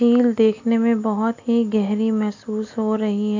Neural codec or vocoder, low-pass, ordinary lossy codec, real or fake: none; 7.2 kHz; AAC, 48 kbps; real